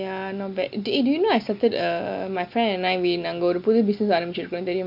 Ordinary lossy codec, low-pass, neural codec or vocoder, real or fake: none; 5.4 kHz; none; real